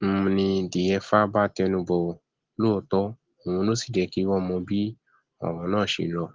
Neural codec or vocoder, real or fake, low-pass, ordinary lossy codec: none; real; 7.2 kHz; Opus, 16 kbps